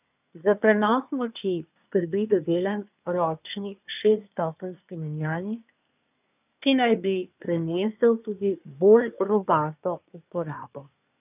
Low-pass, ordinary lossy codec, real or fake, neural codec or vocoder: 3.6 kHz; none; fake; codec, 24 kHz, 1 kbps, SNAC